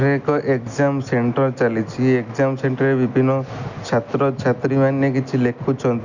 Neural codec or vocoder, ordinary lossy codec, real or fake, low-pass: none; none; real; 7.2 kHz